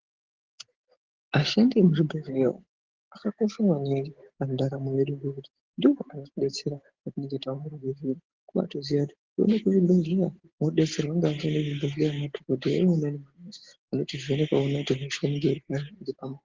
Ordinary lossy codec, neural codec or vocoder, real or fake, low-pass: Opus, 16 kbps; none; real; 7.2 kHz